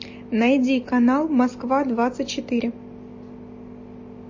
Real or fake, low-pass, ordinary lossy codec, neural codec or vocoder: real; 7.2 kHz; MP3, 32 kbps; none